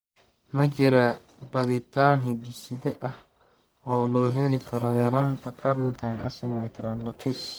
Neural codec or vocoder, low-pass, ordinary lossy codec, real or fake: codec, 44.1 kHz, 1.7 kbps, Pupu-Codec; none; none; fake